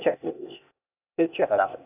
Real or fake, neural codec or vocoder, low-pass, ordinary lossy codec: fake; codec, 16 kHz, 0.8 kbps, ZipCodec; 3.6 kHz; none